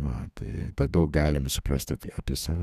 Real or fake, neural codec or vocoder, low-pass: fake; codec, 32 kHz, 1.9 kbps, SNAC; 14.4 kHz